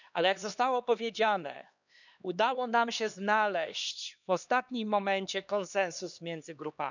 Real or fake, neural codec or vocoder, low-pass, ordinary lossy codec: fake; codec, 16 kHz, 2 kbps, X-Codec, HuBERT features, trained on LibriSpeech; 7.2 kHz; none